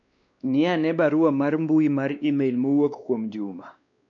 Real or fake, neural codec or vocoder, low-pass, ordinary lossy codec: fake; codec, 16 kHz, 2 kbps, X-Codec, WavLM features, trained on Multilingual LibriSpeech; 7.2 kHz; none